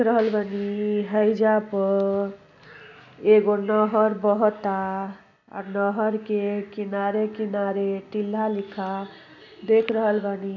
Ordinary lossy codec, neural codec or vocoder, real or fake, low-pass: none; none; real; 7.2 kHz